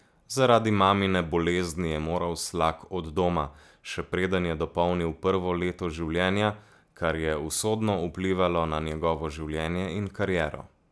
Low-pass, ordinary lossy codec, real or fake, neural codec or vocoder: none; none; real; none